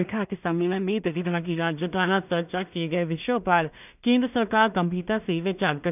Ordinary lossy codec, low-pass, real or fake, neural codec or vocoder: none; 3.6 kHz; fake; codec, 16 kHz in and 24 kHz out, 0.4 kbps, LongCat-Audio-Codec, two codebook decoder